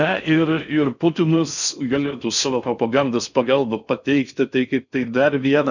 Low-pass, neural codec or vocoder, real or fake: 7.2 kHz; codec, 16 kHz in and 24 kHz out, 0.6 kbps, FocalCodec, streaming, 4096 codes; fake